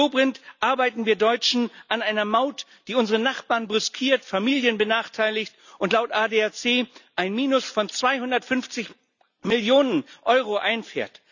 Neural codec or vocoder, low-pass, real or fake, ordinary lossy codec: none; 7.2 kHz; real; none